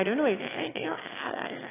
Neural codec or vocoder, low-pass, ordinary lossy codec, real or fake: autoencoder, 22.05 kHz, a latent of 192 numbers a frame, VITS, trained on one speaker; 3.6 kHz; AAC, 16 kbps; fake